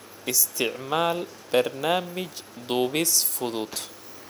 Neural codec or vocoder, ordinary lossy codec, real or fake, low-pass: none; none; real; none